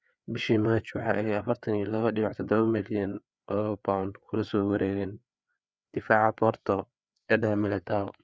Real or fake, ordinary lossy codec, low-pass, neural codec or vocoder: fake; none; none; codec, 16 kHz, 4 kbps, FreqCodec, larger model